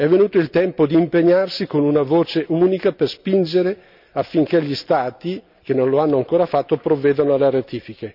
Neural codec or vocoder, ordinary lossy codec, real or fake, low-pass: none; none; real; 5.4 kHz